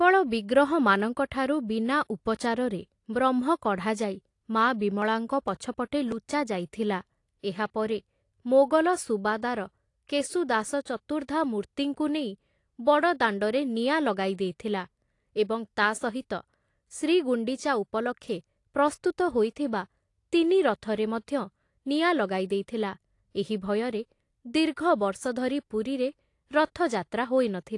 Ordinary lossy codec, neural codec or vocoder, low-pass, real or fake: AAC, 48 kbps; none; 10.8 kHz; real